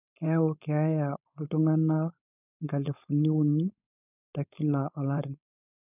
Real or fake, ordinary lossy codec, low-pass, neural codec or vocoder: fake; none; 3.6 kHz; codec, 16 kHz, 4.8 kbps, FACodec